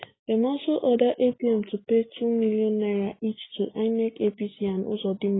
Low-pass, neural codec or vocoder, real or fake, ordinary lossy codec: 7.2 kHz; none; real; AAC, 16 kbps